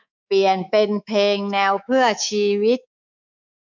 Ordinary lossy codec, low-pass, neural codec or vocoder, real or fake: none; 7.2 kHz; codec, 24 kHz, 3.1 kbps, DualCodec; fake